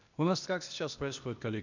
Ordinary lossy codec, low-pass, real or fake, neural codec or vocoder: none; 7.2 kHz; fake; codec, 16 kHz, 0.8 kbps, ZipCodec